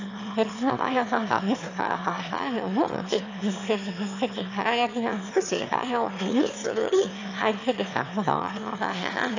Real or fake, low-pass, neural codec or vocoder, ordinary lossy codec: fake; 7.2 kHz; autoencoder, 22.05 kHz, a latent of 192 numbers a frame, VITS, trained on one speaker; AAC, 48 kbps